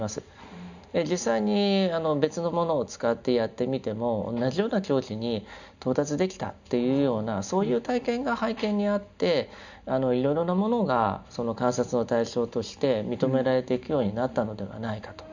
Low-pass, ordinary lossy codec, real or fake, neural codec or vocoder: 7.2 kHz; none; real; none